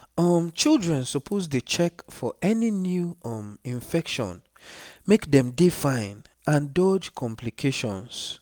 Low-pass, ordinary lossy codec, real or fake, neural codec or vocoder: none; none; real; none